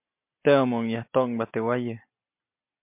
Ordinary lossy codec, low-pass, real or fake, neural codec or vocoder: MP3, 32 kbps; 3.6 kHz; real; none